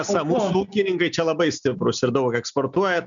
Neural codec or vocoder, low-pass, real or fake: none; 7.2 kHz; real